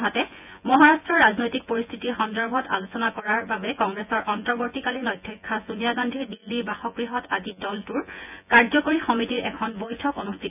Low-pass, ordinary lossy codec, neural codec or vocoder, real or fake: 3.6 kHz; none; vocoder, 24 kHz, 100 mel bands, Vocos; fake